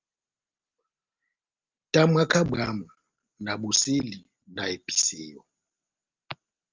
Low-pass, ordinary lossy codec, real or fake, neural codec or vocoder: 7.2 kHz; Opus, 32 kbps; real; none